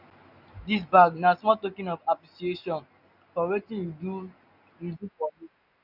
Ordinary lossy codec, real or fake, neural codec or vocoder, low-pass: none; real; none; 5.4 kHz